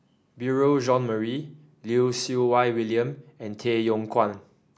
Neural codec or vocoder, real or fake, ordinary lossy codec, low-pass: none; real; none; none